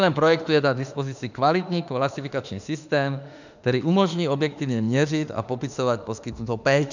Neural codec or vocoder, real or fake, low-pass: autoencoder, 48 kHz, 32 numbers a frame, DAC-VAE, trained on Japanese speech; fake; 7.2 kHz